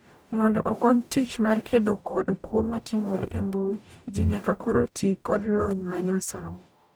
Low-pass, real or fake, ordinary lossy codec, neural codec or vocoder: none; fake; none; codec, 44.1 kHz, 0.9 kbps, DAC